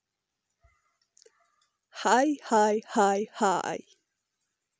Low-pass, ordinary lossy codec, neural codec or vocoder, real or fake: none; none; none; real